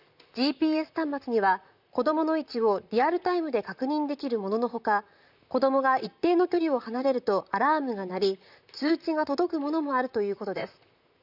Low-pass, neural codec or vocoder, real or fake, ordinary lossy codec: 5.4 kHz; vocoder, 44.1 kHz, 128 mel bands, Pupu-Vocoder; fake; none